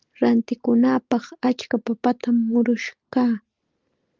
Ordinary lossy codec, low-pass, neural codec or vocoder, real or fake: Opus, 32 kbps; 7.2 kHz; none; real